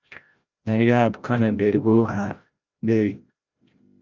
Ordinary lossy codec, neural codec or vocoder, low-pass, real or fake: Opus, 24 kbps; codec, 16 kHz, 0.5 kbps, FreqCodec, larger model; 7.2 kHz; fake